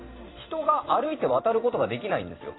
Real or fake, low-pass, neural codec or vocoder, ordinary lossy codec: fake; 7.2 kHz; vocoder, 44.1 kHz, 128 mel bands every 256 samples, BigVGAN v2; AAC, 16 kbps